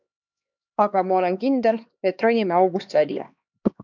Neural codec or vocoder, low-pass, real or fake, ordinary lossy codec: codec, 16 kHz, 2 kbps, X-Codec, HuBERT features, trained on LibriSpeech; 7.2 kHz; fake; MP3, 64 kbps